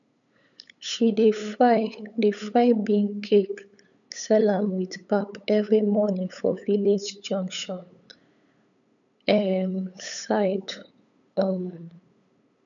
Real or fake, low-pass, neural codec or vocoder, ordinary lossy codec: fake; 7.2 kHz; codec, 16 kHz, 8 kbps, FunCodec, trained on LibriTTS, 25 frames a second; none